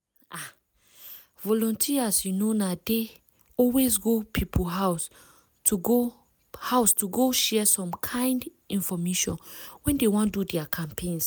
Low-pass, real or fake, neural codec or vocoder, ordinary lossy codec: none; real; none; none